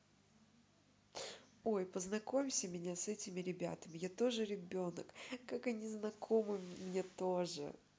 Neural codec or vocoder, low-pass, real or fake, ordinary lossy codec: none; none; real; none